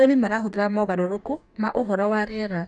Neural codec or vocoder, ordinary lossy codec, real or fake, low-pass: codec, 44.1 kHz, 2.6 kbps, DAC; none; fake; 10.8 kHz